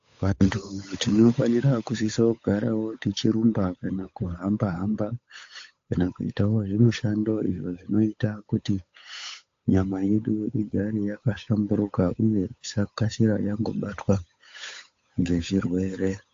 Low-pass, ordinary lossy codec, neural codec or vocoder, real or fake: 7.2 kHz; AAC, 48 kbps; codec, 16 kHz, 8 kbps, FunCodec, trained on Chinese and English, 25 frames a second; fake